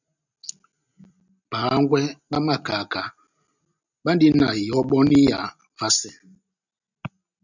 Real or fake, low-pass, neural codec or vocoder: real; 7.2 kHz; none